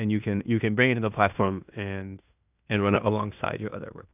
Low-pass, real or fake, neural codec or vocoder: 3.6 kHz; fake; codec, 16 kHz in and 24 kHz out, 0.9 kbps, LongCat-Audio-Codec, fine tuned four codebook decoder